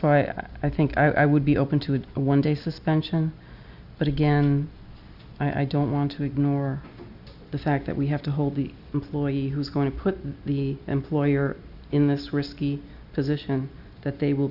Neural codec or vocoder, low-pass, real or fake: none; 5.4 kHz; real